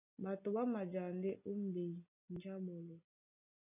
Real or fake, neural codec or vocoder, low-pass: real; none; 3.6 kHz